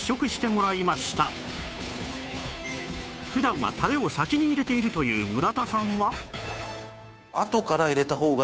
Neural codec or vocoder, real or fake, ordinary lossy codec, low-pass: codec, 16 kHz, 2 kbps, FunCodec, trained on Chinese and English, 25 frames a second; fake; none; none